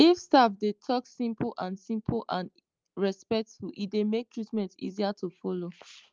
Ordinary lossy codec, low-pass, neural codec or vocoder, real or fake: Opus, 24 kbps; 7.2 kHz; none; real